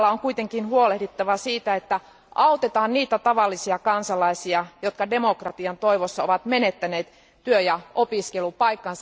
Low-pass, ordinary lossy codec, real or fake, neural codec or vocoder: none; none; real; none